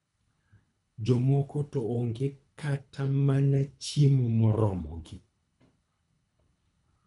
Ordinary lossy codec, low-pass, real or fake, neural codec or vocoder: none; 10.8 kHz; fake; codec, 24 kHz, 3 kbps, HILCodec